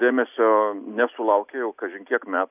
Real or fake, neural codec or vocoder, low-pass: real; none; 3.6 kHz